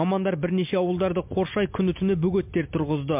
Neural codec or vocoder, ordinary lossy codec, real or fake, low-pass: none; MP3, 32 kbps; real; 3.6 kHz